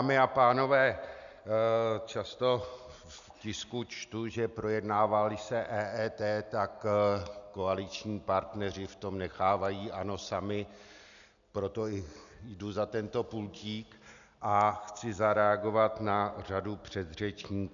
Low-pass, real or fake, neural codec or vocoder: 7.2 kHz; real; none